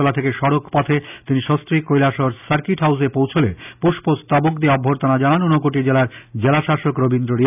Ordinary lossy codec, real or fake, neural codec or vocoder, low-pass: none; real; none; 3.6 kHz